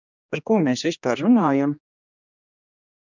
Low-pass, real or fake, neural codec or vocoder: 7.2 kHz; fake; codec, 16 kHz, 1 kbps, X-Codec, HuBERT features, trained on general audio